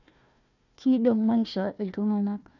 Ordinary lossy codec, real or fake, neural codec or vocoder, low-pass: none; fake; codec, 16 kHz, 1 kbps, FunCodec, trained on Chinese and English, 50 frames a second; 7.2 kHz